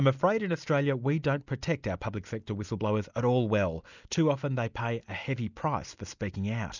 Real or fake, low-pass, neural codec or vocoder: real; 7.2 kHz; none